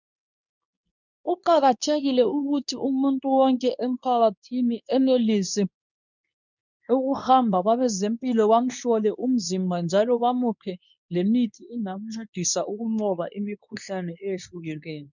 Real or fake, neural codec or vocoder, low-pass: fake; codec, 24 kHz, 0.9 kbps, WavTokenizer, medium speech release version 2; 7.2 kHz